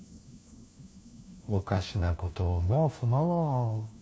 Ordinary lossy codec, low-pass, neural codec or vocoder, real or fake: none; none; codec, 16 kHz, 1 kbps, FunCodec, trained on LibriTTS, 50 frames a second; fake